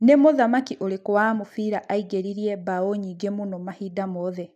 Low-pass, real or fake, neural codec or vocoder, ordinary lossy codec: 14.4 kHz; real; none; none